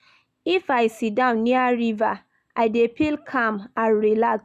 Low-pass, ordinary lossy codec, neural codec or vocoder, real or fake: 14.4 kHz; none; none; real